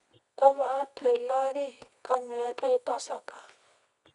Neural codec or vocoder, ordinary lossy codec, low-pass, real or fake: codec, 24 kHz, 0.9 kbps, WavTokenizer, medium music audio release; none; 10.8 kHz; fake